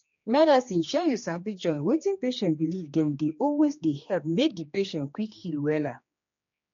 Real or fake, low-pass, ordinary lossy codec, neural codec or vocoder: fake; 7.2 kHz; MP3, 48 kbps; codec, 16 kHz, 2 kbps, X-Codec, HuBERT features, trained on general audio